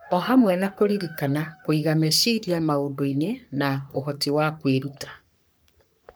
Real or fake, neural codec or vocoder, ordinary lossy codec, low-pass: fake; codec, 44.1 kHz, 3.4 kbps, Pupu-Codec; none; none